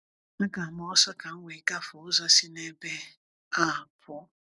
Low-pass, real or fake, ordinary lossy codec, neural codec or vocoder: 10.8 kHz; real; none; none